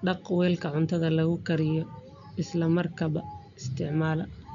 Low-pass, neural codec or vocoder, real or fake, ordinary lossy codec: 7.2 kHz; none; real; MP3, 96 kbps